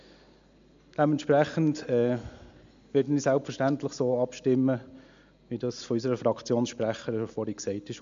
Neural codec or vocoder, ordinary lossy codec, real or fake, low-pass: none; MP3, 64 kbps; real; 7.2 kHz